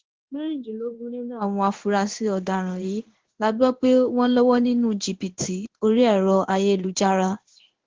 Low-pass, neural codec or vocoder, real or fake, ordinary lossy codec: 7.2 kHz; codec, 16 kHz in and 24 kHz out, 1 kbps, XY-Tokenizer; fake; Opus, 16 kbps